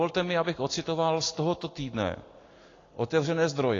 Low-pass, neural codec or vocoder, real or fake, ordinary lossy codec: 7.2 kHz; none; real; AAC, 32 kbps